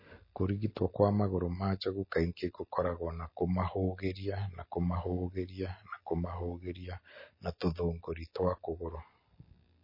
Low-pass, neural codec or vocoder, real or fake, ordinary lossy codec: 5.4 kHz; none; real; MP3, 24 kbps